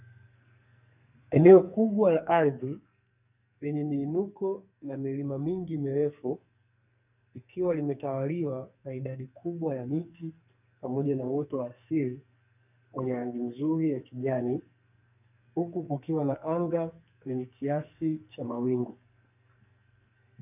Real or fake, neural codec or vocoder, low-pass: fake; codec, 44.1 kHz, 2.6 kbps, SNAC; 3.6 kHz